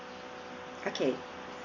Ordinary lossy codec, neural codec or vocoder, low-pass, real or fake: none; none; 7.2 kHz; real